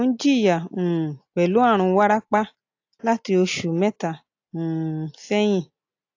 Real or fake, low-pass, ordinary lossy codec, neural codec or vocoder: real; 7.2 kHz; AAC, 48 kbps; none